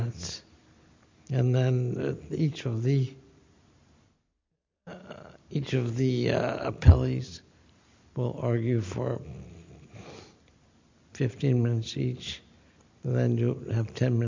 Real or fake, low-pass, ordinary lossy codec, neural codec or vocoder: real; 7.2 kHz; MP3, 64 kbps; none